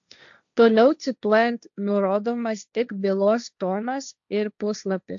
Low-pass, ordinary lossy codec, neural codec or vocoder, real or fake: 7.2 kHz; AAC, 64 kbps; codec, 16 kHz, 1.1 kbps, Voila-Tokenizer; fake